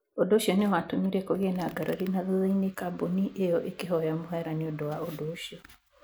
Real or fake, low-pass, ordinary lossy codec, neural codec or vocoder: real; none; none; none